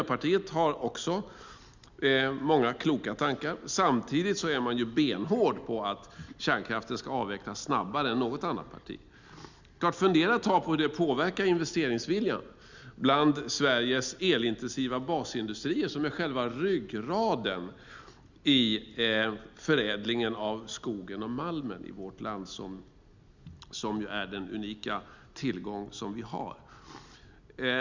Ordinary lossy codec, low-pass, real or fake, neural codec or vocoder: Opus, 64 kbps; 7.2 kHz; real; none